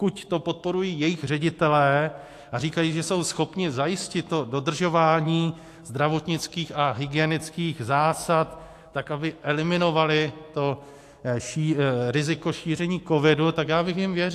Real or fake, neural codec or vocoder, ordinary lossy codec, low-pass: fake; autoencoder, 48 kHz, 128 numbers a frame, DAC-VAE, trained on Japanese speech; AAC, 64 kbps; 14.4 kHz